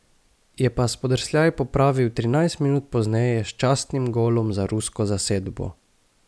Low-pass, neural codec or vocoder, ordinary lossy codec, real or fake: none; none; none; real